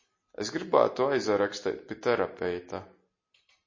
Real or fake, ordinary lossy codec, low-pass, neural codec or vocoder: real; MP3, 32 kbps; 7.2 kHz; none